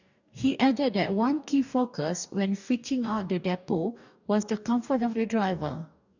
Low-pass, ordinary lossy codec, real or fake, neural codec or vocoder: 7.2 kHz; none; fake; codec, 44.1 kHz, 2.6 kbps, DAC